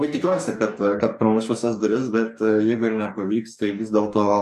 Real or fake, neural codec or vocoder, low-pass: fake; codec, 44.1 kHz, 2.6 kbps, DAC; 14.4 kHz